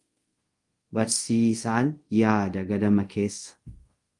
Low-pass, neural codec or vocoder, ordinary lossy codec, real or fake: 10.8 kHz; codec, 24 kHz, 0.5 kbps, DualCodec; Opus, 24 kbps; fake